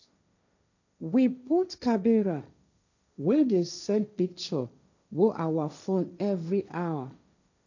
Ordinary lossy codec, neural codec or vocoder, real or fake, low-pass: none; codec, 16 kHz, 1.1 kbps, Voila-Tokenizer; fake; 7.2 kHz